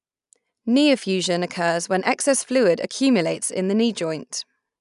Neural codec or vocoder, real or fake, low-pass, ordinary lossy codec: none; real; 10.8 kHz; none